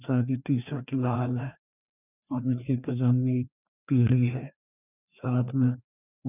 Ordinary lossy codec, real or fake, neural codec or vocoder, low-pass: none; fake; codec, 16 kHz, 2 kbps, FreqCodec, larger model; 3.6 kHz